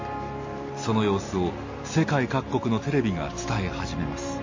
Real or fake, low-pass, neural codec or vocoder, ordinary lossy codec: real; 7.2 kHz; none; MP3, 32 kbps